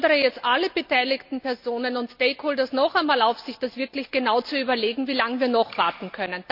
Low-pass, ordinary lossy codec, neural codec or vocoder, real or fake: 5.4 kHz; none; none; real